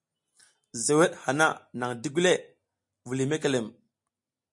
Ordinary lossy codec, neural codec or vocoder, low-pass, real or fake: MP3, 48 kbps; none; 10.8 kHz; real